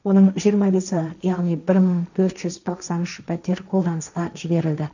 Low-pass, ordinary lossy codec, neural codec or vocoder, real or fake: 7.2 kHz; none; codec, 16 kHz, 1.1 kbps, Voila-Tokenizer; fake